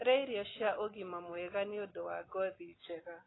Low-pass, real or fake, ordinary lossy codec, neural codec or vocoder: 7.2 kHz; real; AAC, 16 kbps; none